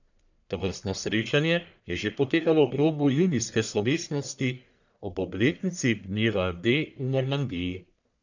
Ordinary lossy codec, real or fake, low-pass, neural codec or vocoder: none; fake; 7.2 kHz; codec, 44.1 kHz, 1.7 kbps, Pupu-Codec